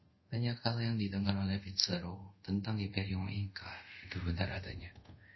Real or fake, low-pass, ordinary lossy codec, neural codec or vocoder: fake; 7.2 kHz; MP3, 24 kbps; codec, 24 kHz, 0.5 kbps, DualCodec